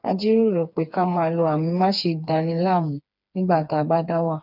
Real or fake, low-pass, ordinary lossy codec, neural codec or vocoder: fake; 5.4 kHz; none; codec, 16 kHz, 4 kbps, FreqCodec, smaller model